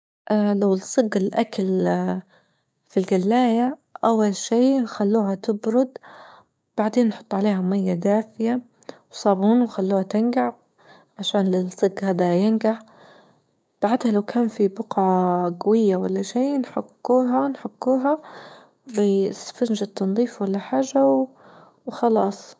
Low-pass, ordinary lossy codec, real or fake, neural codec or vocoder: none; none; fake; codec, 16 kHz, 6 kbps, DAC